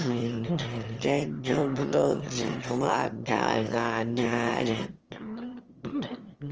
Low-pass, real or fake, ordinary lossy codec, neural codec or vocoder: 7.2 kHz; fake; Opus, 24 kbps; autoencoder, 22.05 kHz, a latent of 192 numbers a frame, VITS, trained on one speaker